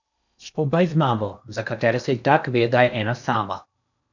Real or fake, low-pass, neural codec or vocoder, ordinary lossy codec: fake; 7.2 kHz; codec, 16 kHz in and 24 kHz out, 0.8 kbps, FocalCodec, streaming, 65536 codes; none